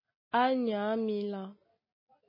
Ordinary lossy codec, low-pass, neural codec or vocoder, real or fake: MP3, 24 kbps; 5.4 kHz; none; real